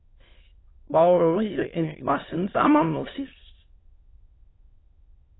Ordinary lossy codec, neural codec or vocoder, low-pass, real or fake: AAC, 16 kbps; autoencoder, 22.05 kHz, a latent of 192 numbers a frame, VITS, trained on many speakers; 7.2 kHz; fake